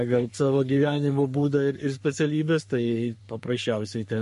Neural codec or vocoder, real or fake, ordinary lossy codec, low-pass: codec, 44.1 kHz, 3.4 kbps, Pupu-Codec; fake; MP3, 48 kbps; 14.4 kHz